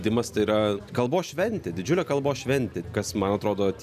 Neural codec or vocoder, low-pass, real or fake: none; 14.4 kHz; real